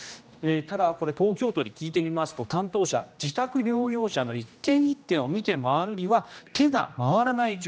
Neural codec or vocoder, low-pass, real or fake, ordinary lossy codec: codec, 16 kHz, 1 kbps, X-Codec, HuBERT features, trained on general audio; none; fake; none